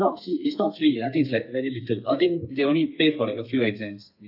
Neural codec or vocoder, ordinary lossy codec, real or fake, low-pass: codec, 32 kHz, 1.9 kbps, SNAC; none; fake; 5.4 kHz